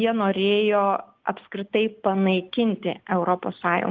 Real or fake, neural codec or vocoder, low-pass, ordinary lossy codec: real; none; 7.2 kHz; Opus, 24 kbps